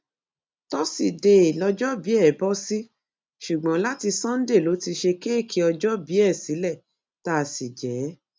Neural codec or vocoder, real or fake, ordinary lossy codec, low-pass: none; real; none; none